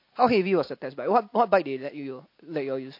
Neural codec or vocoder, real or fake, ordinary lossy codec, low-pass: none; real; MP3, 32 kbps; 5.4 kHz